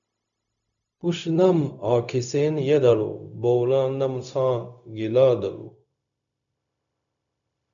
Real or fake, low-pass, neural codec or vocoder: fake; 7.2 kHz; codec, 16 kHz, 0.4 kbps, LongCat-Audio-Codec